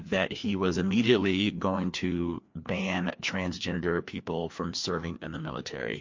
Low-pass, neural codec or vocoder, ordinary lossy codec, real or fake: 7.2 kHz; codec, 16 kHz, 2 kbps, FreqCodec, larger model; MP3, 48 kbps; fake